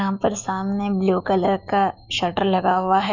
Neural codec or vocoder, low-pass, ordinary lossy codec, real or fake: autoencoder, 48 kHz, 128 numbers a frame, DAC-VAE, trained on Japanese speech; 7.2 kHz; none; fake